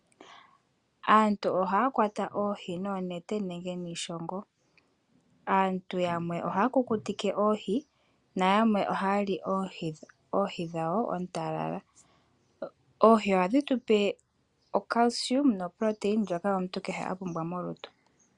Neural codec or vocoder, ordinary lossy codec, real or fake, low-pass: none; Opus, 64 kbps; real; 10.8 kHz